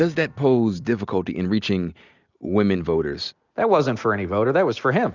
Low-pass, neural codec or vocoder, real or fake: 7.2 kHz; none; real